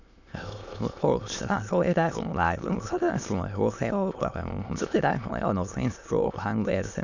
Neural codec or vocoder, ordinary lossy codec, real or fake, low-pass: autoencoder, 22.05 kHz, a latent of 192 numbers a frame, VITS, trained on many speakers; none; fake; 7.2 kHz